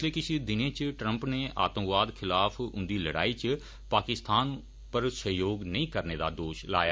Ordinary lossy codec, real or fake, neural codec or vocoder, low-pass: none; real; none; none